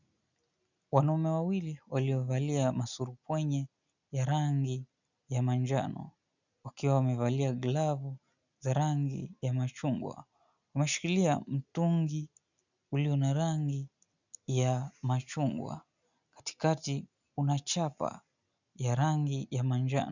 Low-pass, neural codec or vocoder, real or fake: 7.2 kHz; none; real